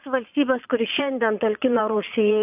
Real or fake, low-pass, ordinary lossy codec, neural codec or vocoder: fake; 3.6 kHz; AAC, 32 kbps; vocoder, 22.05 kHz, 80 mel bands, Vocos